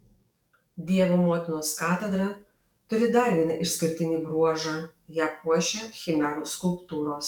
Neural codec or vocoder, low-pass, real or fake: codec, 44.1 kHz, 7.8 kbps, DAC; 19.8 kHz; fake